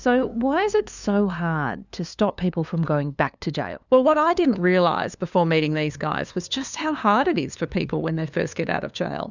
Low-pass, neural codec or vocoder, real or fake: 7.2 kHz; codec, 16 kHz, 2 kbps, FunCodec, trained on LibriTTS, 25 frames a second; fake